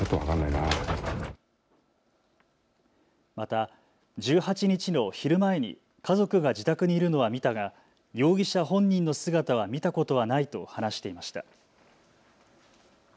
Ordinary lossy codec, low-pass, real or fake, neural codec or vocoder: none; none; real; none